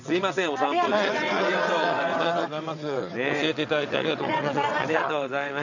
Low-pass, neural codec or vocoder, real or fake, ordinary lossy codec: 7.2 kHz; vocoder, 22.05 kHz, 80 mel bands, WaveNeXt; fake; none